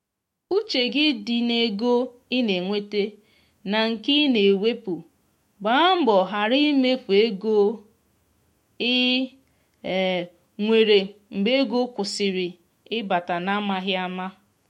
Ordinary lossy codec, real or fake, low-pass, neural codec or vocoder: MP3, 64 kbps; fake; 19.8 kHz; autoencoder, 48 kHz, 128 numbers a frame, DAC-VAE, trained on Japanese speech